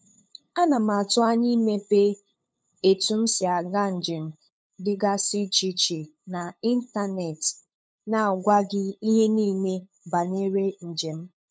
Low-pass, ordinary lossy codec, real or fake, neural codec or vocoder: none; none; fake; codec, 16 kHz, 8 kbps, FunCodec, trained on LibriTTS, 25 frames a second